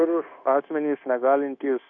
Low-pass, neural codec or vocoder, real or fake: 7.2 kHz; codec, 16 kHz, 0.9 kbps, LongCat-Audio-Codec; fake